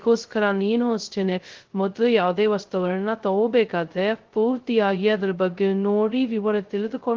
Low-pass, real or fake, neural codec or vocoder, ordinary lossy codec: 7.2 kHz; fake; codec, 16 kHz, 0.2 kbps, FocalCodec; Opus, 16 kbps